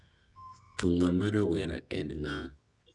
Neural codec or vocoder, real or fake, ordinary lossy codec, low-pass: codec, 24 kHz, 0.9 kbps, WavTokenizer, medium music audio release; fake; none; 10.8 kHz